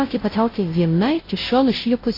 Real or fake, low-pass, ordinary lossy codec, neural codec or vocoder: fake; 5.4 kHz; AAC, 24 kbps; codec, 16 kHz, 0.5 kbps, FunCodec, trained on Chinese and English, 25 frames a second